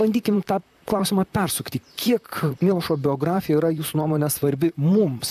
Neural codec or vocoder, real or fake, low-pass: vocoder, 44.1 kHz, 128 mel bands, Pupu-Vocoder; fake; 14.4 kHz